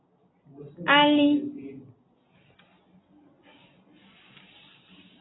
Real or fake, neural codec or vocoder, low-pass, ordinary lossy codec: real; none; 7.2 kHz; AAC, 16 kbps